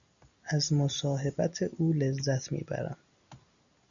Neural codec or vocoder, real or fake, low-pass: none; real; 7.2 kHz